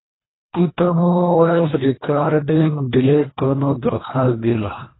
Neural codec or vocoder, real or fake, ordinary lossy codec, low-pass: codec, 24 kHz, 1.5 kbps, HILCodec; fake; AAC, 16 kbps; 7.2 kHz